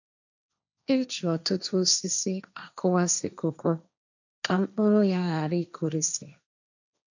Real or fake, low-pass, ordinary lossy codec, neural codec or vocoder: fake; 7.2 kHz; none; codec, 16 kHz, 1.1 kbps, Voila-Tokenizer